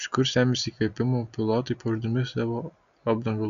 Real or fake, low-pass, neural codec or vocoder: real; 7.2 kHz; none